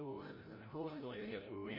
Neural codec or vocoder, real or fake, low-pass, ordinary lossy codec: codec, 16 kHz, 0.5 kbps, FreqCodec, larger model; fake; 5.4 kHz; MP3, 24 kbps